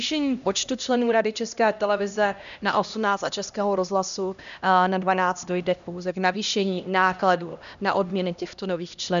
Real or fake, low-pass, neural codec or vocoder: fake; 7.2 kHz; codec, 16 kHz, 1 kbps, X-Codec, HuBERT features, trained on LibriSpeech